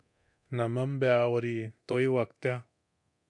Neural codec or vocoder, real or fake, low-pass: codec, 24 kHz, 0.9 kbps, DualCodec; fake; 10.8 kHz